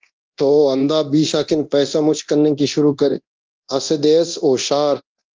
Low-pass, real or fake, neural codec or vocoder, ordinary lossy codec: 7.2 kHz; fake; codec, 24 kHz, 0.9 kbps, DualCodec; Opus, 24 kbps